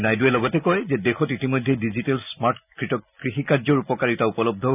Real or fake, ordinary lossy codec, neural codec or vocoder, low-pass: real; none; none; 3.6 kHz